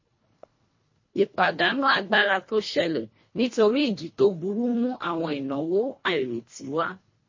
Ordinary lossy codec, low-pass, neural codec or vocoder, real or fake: MP3, 32 kbps; 7.2 kHz; codec, 24 kHz, 1.5 kbps, HILCodec; fake